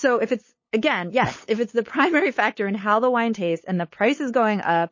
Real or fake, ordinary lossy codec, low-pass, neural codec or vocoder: fake; MP3, 32 kbps; 7.2 kHz; codec, 16 kHz, 8 kbps, FunCodec, trained on LibriTTS, 25 frames a second